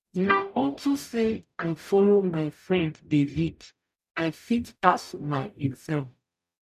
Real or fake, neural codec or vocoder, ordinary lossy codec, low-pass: fake; codec, 44.1 kHz, 0.9 kbps, DAC; none; 14.4 kHz